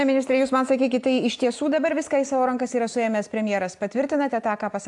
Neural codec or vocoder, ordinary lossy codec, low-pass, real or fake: none; AAC, 64 kbps; 10.8 kHz; real